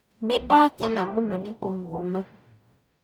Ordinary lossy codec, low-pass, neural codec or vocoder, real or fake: none; none; codec, 44.1 kHz, 0.9 kbps, DAC; fake